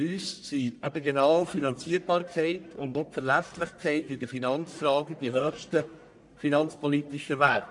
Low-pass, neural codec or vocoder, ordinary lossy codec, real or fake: 10.8 kHz; codec, 44.1 kHz, 1.7 kbps, Pupu-Codec; none; fake